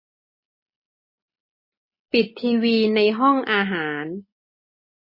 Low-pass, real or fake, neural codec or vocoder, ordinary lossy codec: 5.4 kHz; real; none; MP3, 24 kbps